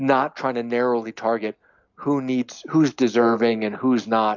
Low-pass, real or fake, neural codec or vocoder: 7.2 kHz; real; none